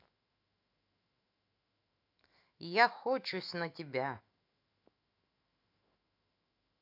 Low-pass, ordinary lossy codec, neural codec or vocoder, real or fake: 5.4 kHz; AAC, 32 kbps; autoencoder, 48 kHz, 128 numbers a frame, DAC-VAE, trained on Japanese speech; fake